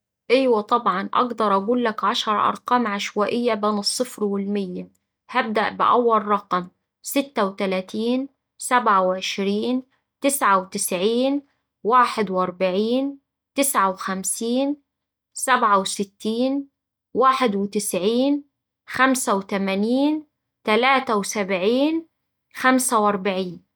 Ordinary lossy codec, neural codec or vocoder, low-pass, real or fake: none; none; none; real